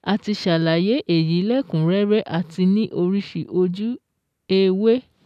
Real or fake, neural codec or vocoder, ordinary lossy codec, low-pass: real; none; none; 14.4 kHz